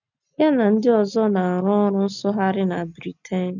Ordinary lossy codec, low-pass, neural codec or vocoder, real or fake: none; 7.2 kHz; none; real